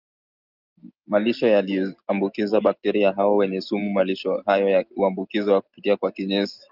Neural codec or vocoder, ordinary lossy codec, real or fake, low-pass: none; Opus, 24 kbps; real; 5.4 kHz